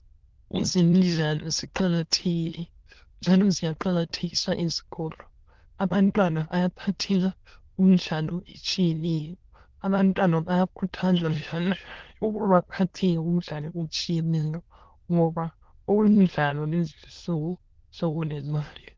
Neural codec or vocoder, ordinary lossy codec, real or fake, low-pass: autoencoder, 22.05 kHz, a latent of 192 numbers a frame, VITS, trained on many speakers; Opus, 16 kbps; fake; 7.2 kHz